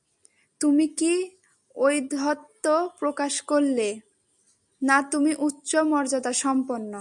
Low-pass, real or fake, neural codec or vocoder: 10.8 kHz; real; none